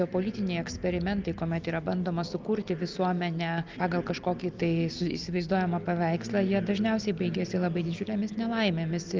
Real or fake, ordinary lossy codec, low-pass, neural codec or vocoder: real; Opus, 32 kbps; 7.2 kHz; none